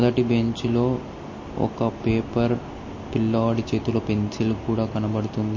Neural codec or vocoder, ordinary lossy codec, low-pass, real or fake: none; MP3, 32 kbps; 7.2 kHz; real